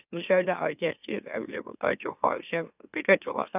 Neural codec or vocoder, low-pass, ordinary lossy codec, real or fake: autoencoder, 44.1 kHz, a latent of 192 numbers a frame, MeloTTS; 3.6 kHz; none; fake